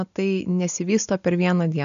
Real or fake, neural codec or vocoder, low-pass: real; none; 7.2 kHz